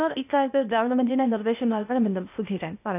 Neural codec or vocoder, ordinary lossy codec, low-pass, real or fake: codec, 16 kHz, 0.8 kbps, ZipCodec; none; 3.6 kHz; fake